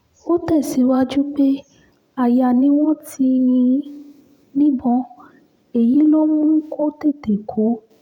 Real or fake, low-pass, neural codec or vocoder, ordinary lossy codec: fake; 19.8 kHz; vocoder, 44.1 kHz, 128 mel bands every 512 samples, BigVGAN v2; none